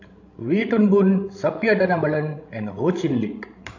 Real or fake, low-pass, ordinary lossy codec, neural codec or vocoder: fake; 7.2 kHz; AAC, 48 kbps; codec, 16 kHz, 16 kbps, FreqCodec, larger model